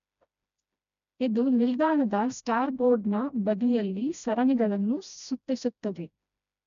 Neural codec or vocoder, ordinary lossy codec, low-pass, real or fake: codec, 16 kHz, 1 kbps, FreqCodec, smaller model; none; 7.2 kHz; fake